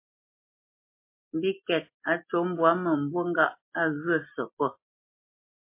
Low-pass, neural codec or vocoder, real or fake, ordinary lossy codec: 3.6 kHz; none; real; MP3, 24 kbps